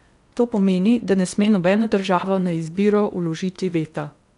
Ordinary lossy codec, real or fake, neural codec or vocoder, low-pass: none; fake; codec, 16 kHz in and 24 kHz out, 0.8 kbps, FocalCodec, streaming, 65536 codes; 10.8 kHz